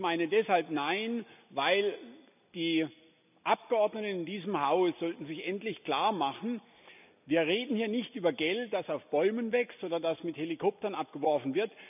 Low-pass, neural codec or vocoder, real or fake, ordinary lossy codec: 3.6 kHz; none; real; none